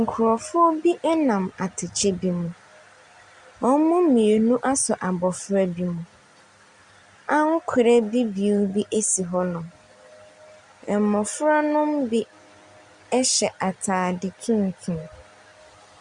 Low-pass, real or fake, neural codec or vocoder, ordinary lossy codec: 10.8 kHz; real; none; Opus, 64 kbps